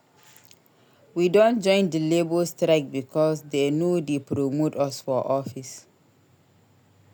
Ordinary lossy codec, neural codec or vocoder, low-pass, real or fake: none; none; none; real